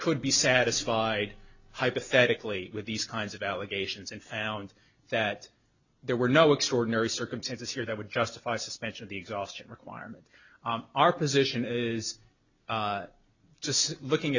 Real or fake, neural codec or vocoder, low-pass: real; none; 7.2 kHz